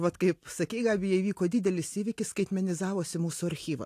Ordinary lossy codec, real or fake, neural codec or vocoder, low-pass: AAC, 64 kbps; real; none; 14.4 kHz